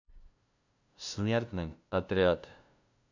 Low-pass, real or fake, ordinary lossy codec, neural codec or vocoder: 7.2 kHz; fake; none; codec, 16 kHz, 0.5 kbps, FunCodec, trained on LibriTTS, 25 frames a second